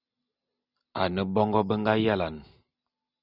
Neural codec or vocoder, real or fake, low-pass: none; real; 5.4 kHz